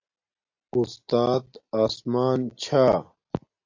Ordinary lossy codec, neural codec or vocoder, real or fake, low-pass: AAC, 48 kbps; none; real; 7.2 kHz